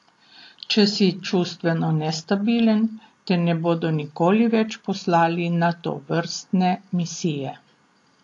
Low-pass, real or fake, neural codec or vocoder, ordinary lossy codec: 10.8 kHz; real; none; AAC, 48 kbps